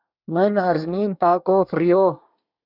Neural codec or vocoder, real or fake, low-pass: codec, 24 kHz, 1 kbps, SNAC; fake; 5.4 kHz